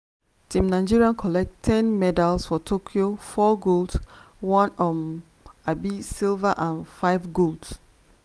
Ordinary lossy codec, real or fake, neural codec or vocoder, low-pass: none; real; none; none